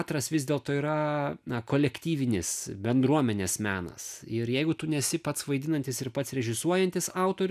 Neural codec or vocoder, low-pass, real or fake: none; 14.4 kHz; real